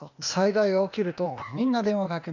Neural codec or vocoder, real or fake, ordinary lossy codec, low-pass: codec, 16 kHz, 0.8 kbps, ZipCodec; fake; none; 7.2 kHz